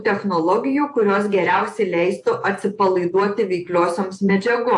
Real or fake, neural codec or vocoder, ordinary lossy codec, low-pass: fake; vocoder, 48 kHz, 128 mel bands, Vocos; AAC, 64 kbps; 10.8 kHz